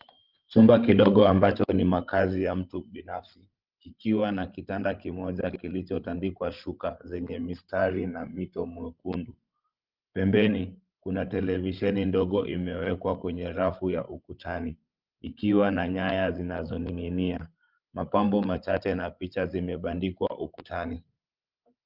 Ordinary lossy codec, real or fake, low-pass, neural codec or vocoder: Opus, 32 kbps; fake; 5.4 kHz; codec, 16 kHz, 16 kbps, FreqCodec, larger model